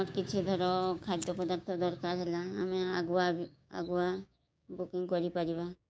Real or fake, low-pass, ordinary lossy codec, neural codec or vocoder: fake; none; none; codec, 16 kHz, 6 kbps, DAC